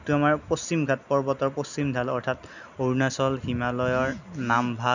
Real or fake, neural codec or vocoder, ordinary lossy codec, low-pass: real; none; none; 7.2 kHz